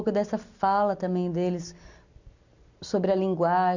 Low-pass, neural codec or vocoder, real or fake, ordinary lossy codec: 7.2 kHz; none; real; none